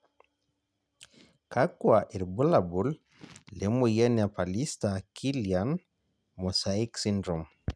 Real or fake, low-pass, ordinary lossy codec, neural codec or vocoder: real; none; none; none